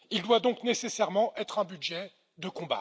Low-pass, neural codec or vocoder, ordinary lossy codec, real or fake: none; none; none; real